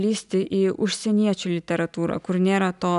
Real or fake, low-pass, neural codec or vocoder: real; 10.8 kHz; none